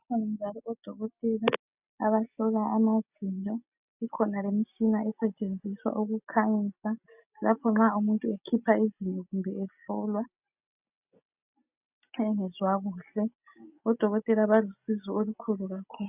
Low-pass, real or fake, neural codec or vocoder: 3.6 kHz; real; none